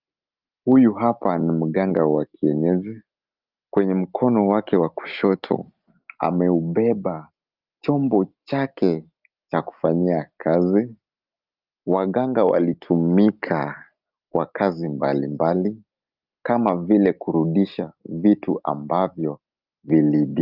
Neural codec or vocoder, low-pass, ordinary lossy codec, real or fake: none; 5.4 kHz; Opus, 32 kbps; real